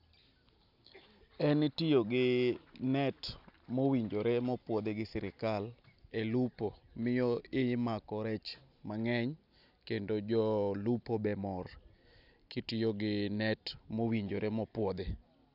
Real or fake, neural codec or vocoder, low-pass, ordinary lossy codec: real; none; 5.4 kHz; none